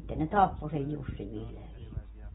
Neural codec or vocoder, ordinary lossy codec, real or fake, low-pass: none; AAC, 16 kbps; real; 19.8 kHz